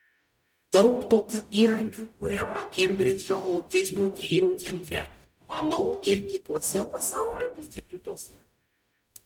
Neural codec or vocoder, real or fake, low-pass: codec, 44.1 kHz, 0.9 kbps, DAC; fake; 19.8 kHz